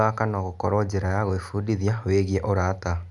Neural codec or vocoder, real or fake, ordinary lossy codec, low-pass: none; real; none; 10.8 kHz